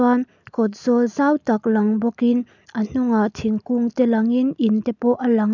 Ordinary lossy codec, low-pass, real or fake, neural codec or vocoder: none; 7.2 kHz; real; none